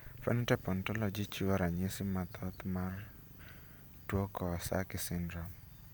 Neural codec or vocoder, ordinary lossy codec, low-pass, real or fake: vocoder, 44.1 kHz, 128 mel bands every 512 samples, BigVGAN v2; none; none; fake